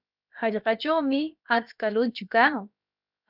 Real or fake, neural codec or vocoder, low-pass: fake; codec, 16 kHz, about 1 kbps, DyCAST, with the encoder's durations; 5.4 kHz